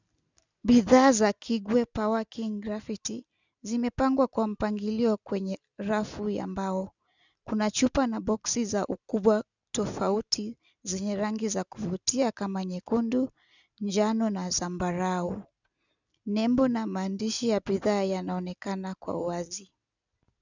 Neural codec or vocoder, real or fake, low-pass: none; real; 7.2 kHz